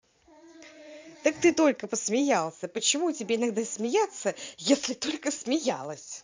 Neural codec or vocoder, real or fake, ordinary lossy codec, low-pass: vocoder, 44.1 kHz, 80 mel bands, Vocos; fake; MP3, 64 kbps; 7.2 kHz